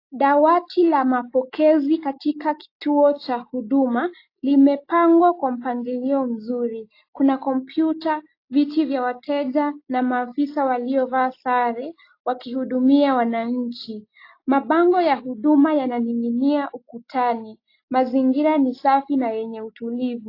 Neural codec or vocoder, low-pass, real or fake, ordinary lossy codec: none; 5.4 kHz; real; AAC, 32 kbps